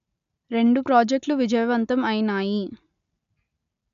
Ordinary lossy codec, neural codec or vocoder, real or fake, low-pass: none; none; real; 7.2 kHz